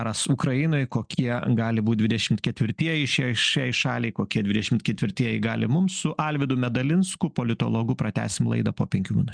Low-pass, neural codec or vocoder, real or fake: 9.9 kHz; none; real